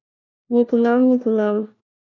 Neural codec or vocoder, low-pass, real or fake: codec, 16 kHz, 1 kbps, FunCodec, trained on LibriTTS, 50 frames a second; 7.2 kHz; fake